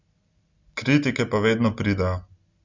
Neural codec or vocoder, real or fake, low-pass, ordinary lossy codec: none; real; 7.2 kHz; Opus, 64 kbps